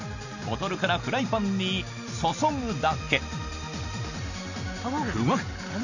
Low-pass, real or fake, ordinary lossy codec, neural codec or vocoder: 7.2 kHz; real; none; none